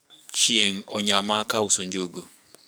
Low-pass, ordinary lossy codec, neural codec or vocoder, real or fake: none; none; codec, 44.1 kHz, 2.6 kbps, SNAC; fake